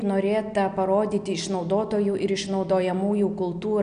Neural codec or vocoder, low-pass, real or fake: none; 9.9 kHz; real